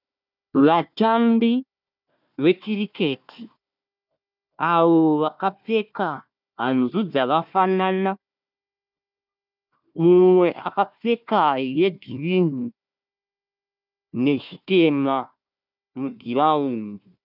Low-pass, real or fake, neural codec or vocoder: 5.4 kHz; fake; codec, 16 kHz, 1 kbps, FunCodec, trained on Chinese and English, 50 frames a second